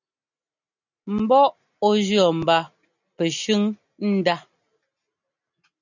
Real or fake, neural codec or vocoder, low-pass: real; none; 7.2 kHz